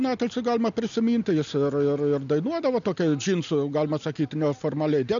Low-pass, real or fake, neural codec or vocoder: 7.2 kHz; real; none